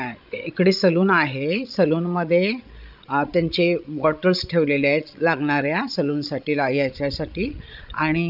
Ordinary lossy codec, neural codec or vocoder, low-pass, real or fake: none; codec, 16 kHz, 16 kbps, FreqCodec, larger model; 5.4 kHz; fake